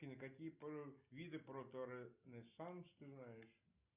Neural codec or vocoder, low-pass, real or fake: none; 3.6 kHz; real